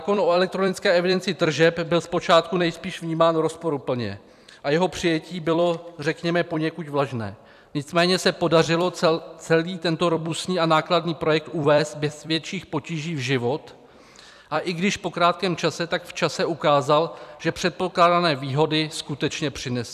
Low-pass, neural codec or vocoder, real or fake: 14.4 kHz; vocoder, 44.1 kHz, 128 mel bands every 256 samples, BigVGAN v2; fake